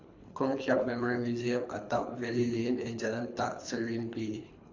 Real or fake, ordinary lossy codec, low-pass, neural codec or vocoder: fake; AAC, 48 kbps; 7.2 kHz; codec, 24 kHz, 3 kbps, HILCodec